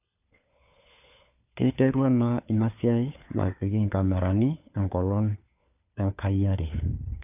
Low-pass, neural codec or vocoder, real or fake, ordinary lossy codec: 3.6 kHz; codec, 16 kHz, 4 kbps, FunCodec, trained on LibriTTS, 50 frames a second; fake; none